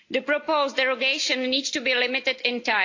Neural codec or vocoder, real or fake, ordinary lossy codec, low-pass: none; real; AAC, 48 kbps; 7.2 kHz